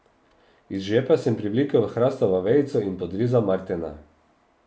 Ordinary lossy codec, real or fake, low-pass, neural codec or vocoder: none; real; none; none